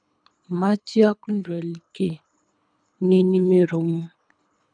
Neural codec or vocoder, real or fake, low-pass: codec, 24 kHz, 3 kbps, HILCodec; fake; 9.9 kHz